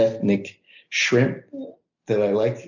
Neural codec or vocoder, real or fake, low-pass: none; real; 7.2 kHz